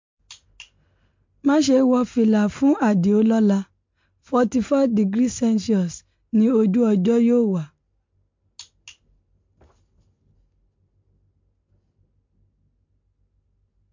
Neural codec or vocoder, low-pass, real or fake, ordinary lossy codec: none; 7.2 kHz; real; MP3, 48 kbps